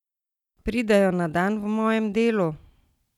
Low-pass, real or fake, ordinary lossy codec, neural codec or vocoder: 19.8 kHz; real; none; none